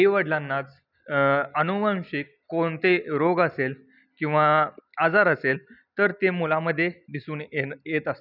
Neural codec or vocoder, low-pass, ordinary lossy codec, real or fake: none; 5.4 kHz; none; real